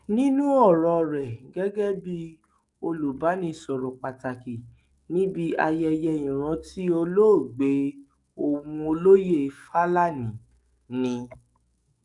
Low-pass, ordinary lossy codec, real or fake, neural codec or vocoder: 10.8 kHz; none; fake; codec, 44.1 kHz, 7.8 kbps, DAC